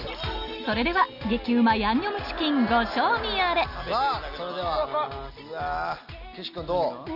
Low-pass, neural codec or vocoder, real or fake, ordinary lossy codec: 5.4 kHz; none; real; none